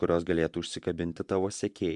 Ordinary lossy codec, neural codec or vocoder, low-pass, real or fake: MP3, 96 kbps; vocoder, 24 kHz, 100 mel bands, Vocos; 10.8 kHz; fake